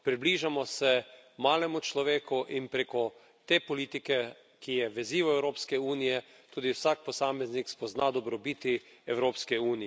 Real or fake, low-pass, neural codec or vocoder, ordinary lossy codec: real; none; none; none